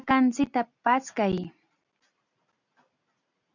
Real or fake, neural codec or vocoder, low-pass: real; none; 7.2 kHz